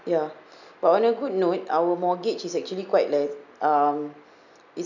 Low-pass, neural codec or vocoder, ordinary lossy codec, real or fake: 7.2 kHz; none; none; real